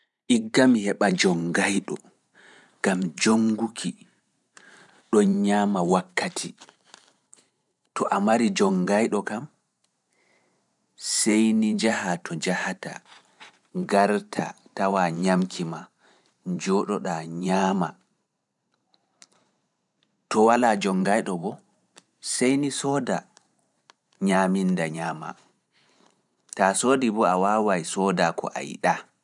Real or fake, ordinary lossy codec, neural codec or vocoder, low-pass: fake; none; vocoder, 44.1 kHz, 128 mel bands every 512 samples, BigVGAN v2; 10.8 kHz